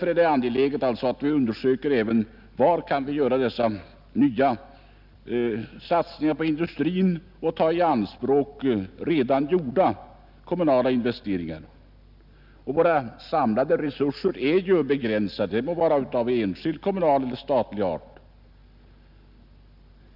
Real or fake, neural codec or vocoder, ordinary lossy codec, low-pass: real; none; none; 5.4 kHz